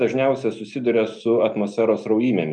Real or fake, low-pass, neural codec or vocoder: real; 10.8 kHz; none